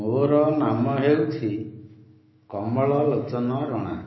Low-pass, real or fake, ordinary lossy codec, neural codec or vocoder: 7.2 kHz; fake; MP3, 24 kbps; autoencoder, 48 kHz, 128 numbers a frame, DAC-VAE, trained on Japanese speech